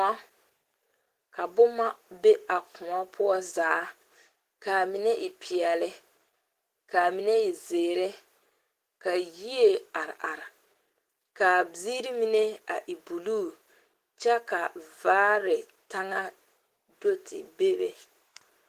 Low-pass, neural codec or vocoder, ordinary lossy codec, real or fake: 14.4 kHz; autoencoder, 48 kHz, 128 numbers a frame, DAC-VAE, trained on Japanese speech; Opus, 16 kbps; fake